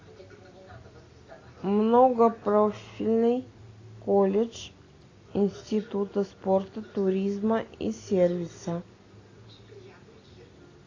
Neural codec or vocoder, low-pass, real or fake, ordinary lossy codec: none; 7.2 kHz; real; AAC, 32 kbps